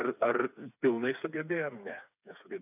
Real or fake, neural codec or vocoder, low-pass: fake; codec, 16 kHz, 4 kbps, FreqCodec, smaller model; 3.6 kHz